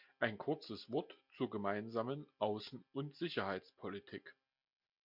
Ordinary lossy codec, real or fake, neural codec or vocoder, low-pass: MP3, 48 kbps; real; none; 5.4 kHz